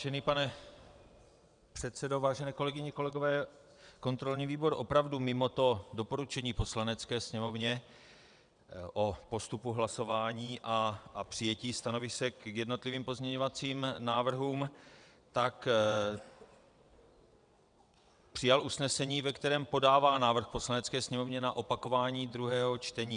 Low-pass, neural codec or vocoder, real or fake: 9.9 kHz; vocoder, 22.05 kHz, 80 mel bands, WaveNeXt; fake